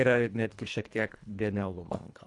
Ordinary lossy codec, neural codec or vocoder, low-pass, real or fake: AAC, 64 kbps; codec, 24 kHz, 1.5 kbps, HILCodec; 10.8 kHz; fake